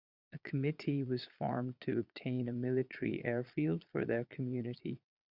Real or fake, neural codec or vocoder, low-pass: fake; vocoder, 22.05 kHz, 80 mel bands, Vocos; 5.4 kHz